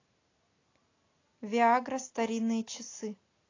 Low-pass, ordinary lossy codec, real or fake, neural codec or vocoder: 7.2 kHz; AAC, 32 kbps; real; none